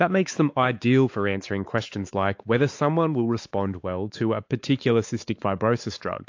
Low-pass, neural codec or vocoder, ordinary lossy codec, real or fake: 7.2 kHz; codec, 16 kHz, 4 kbps, X-Codec, WavLM features, trained on Multilingual LibriSpeech; AAC, 48 kbps; fake